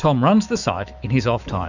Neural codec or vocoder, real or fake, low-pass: autoencoder, 48 kHz, 128 numbers a frame, DAC-VAE, trained on Japanese speech; fake; 7.2 kHz